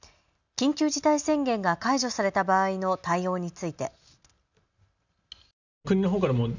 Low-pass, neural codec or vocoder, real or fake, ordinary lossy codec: 7.2 kHz; none; real; none